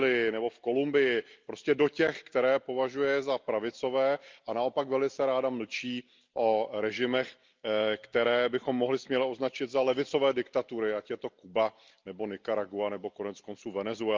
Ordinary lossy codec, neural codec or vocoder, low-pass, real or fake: Opus, 32 kbps; none; 7.2 kHz; real